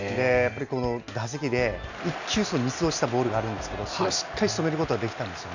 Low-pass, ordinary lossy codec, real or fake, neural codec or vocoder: 7.2 kHz; none; real; none